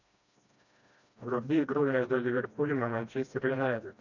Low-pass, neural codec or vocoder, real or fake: 7.2 kHz; codec, 16 kHz, 1 kbps, FreqCodec, smaller model; fake